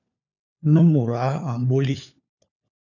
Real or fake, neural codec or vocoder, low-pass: fake; codec, 16 kHz, 4 kbps, FunCodec, trained on LibriTTS, 50 frames a second; 7.2 kHz